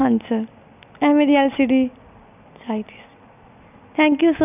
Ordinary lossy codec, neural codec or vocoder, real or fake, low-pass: none; none; real; 3.6 kHz